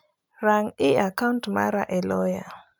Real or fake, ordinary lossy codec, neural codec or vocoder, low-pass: real; none; none; none